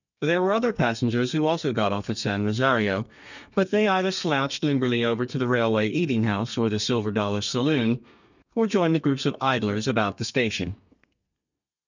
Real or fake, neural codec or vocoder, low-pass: fake; codec, 32 kHz, 1.9 kbps, SNAC; 7.2 kHz